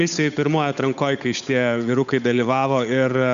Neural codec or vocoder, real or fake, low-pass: codec, 16 kHz, 8 kbps, FunCodec, trained on Chinese and English, 25 frames a second; fake; 7.2 kHz